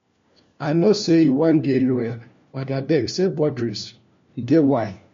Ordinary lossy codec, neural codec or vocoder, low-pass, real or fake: MP3, 48 kbps; codec, 16 kHz, 1 kbps, FunCodec, trained on LibriTTS, 50 frames a second; 7.2 kHz; fake